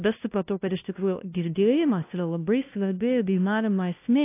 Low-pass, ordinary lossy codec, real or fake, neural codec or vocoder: 3.6 kHz; AAC, 24 kbps; fake; codec, 16 kHz, 0.5 kbps, FunCodec, trained on LibriTTS, 25 frames a second